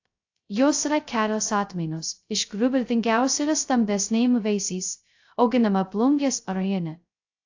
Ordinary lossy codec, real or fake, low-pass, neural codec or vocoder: AAC, 48 kbps; fake; 7.2 kHz; codec, 16 kHz, 0.2 kbps, FocalCodec